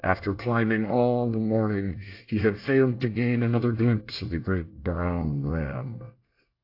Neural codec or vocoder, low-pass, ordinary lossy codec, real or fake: codec, 24 kHz, 1 kbps, SNAC; 5.4 kHz; AAC, 32 kbps; fake